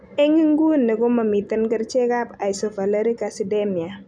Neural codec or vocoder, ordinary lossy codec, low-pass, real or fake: none; none; 9.9 kHz; real